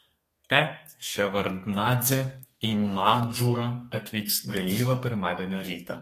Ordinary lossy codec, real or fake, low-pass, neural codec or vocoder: AAC, 48 kbps; fake; 14.4 kHz; codec, 32 kHz, 1.9 kbps, SNAC